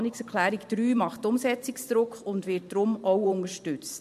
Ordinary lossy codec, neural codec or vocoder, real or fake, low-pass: MP3, 64 kbps; vocoder, 44.1 kHz, 128 mel bands every 512 samples, BigVGAN v2; fake; 14.4 kHz